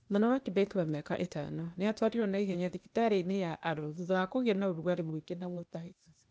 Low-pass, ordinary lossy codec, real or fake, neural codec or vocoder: none; none; fake; codec, 16 kHz, 0.8 kbps, ZipCodec